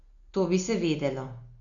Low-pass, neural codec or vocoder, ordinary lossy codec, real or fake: 7.2 kHz; none; AAC, 64 kbps; real